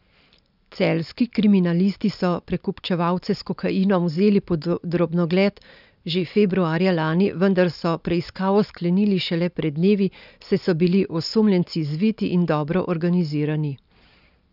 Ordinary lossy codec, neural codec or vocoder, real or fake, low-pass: none; none; real; 5.4 kHz